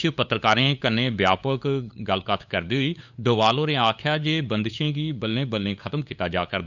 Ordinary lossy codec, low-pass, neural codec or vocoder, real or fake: none; 7.2 kHz; codec, 16 kHz, 8 kbps, FunCodec, trained on LibriTTS, 25 frames a second; fake